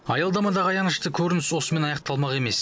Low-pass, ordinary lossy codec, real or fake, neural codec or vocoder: none; none; real; none